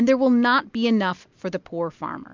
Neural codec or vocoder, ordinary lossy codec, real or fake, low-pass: none; MP3, 64 kbps; real; 7.2 kHz